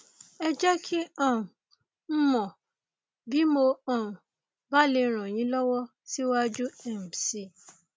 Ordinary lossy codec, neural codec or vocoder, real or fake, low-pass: none; none; real; none